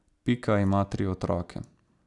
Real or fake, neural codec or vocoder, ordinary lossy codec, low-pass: real; none; none; 10.8 kHz